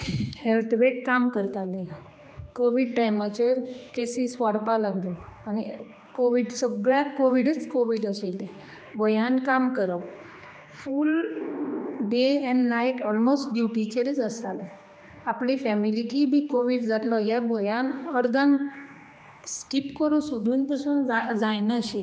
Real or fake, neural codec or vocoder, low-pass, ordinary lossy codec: fake; codec, 16 kHz, 2 kbps, X-Codec, HuBERT features, trained on general audio; none; none